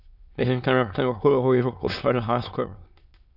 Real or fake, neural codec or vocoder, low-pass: fake; autoencoder, 22.05 kHz, a latent of 192 numbers a frame, VITS, trained on many speakers; 5.4 kHz